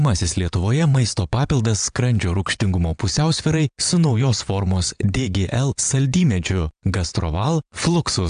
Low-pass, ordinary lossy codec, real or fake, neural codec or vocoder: 9.9 kHz; AAC, 96 kbps; fake; vocoder, 22.05 kHz, 80 mel bands, WaveNeXt